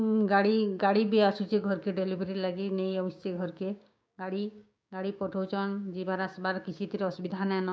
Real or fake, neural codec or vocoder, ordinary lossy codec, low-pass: fake; codec, 16 kHz, 6 kbps, DAC; none; none